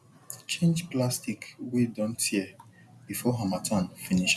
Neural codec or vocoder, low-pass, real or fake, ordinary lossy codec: none; none; real; none